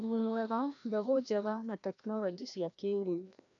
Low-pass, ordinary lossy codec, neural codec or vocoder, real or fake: 7.2 kHz; none; codec, 16 kHz, 1 kbps, FreqCodec, larger model; fake